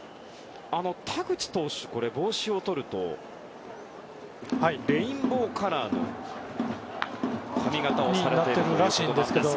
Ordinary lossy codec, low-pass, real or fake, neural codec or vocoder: none; none; real; none